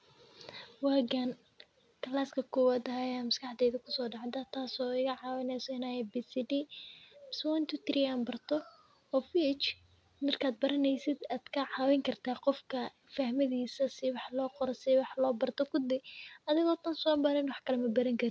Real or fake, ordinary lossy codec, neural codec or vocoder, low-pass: real; none; none; none